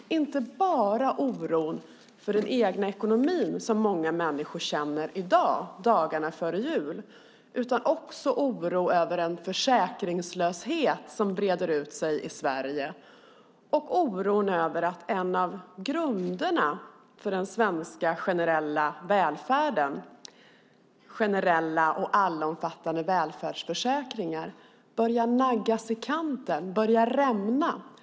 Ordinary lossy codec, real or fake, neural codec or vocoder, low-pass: none; real; none; none